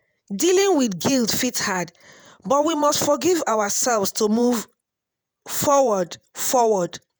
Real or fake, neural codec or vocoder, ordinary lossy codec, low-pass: fake; vocoder, 48 kHz, 128 mel bands, Vocos; none; none